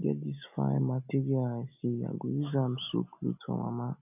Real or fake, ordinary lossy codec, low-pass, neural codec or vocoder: real; none; 3.6 kHz; none